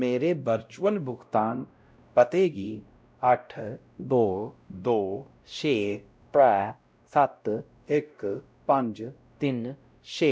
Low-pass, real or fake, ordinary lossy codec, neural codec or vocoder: none; fake; none; codec, 16 kHz, 0.5 kbps, X-Codec, WavLM features, trained on Multilingual LibriSpeech